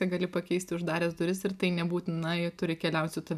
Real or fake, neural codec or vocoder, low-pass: real; none; 14.4 kHz